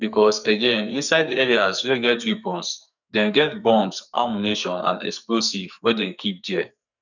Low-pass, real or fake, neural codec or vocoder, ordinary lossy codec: 7.2 kHz; fake; codec, 44.1 kHz, 2.6 kbps, SNAC; none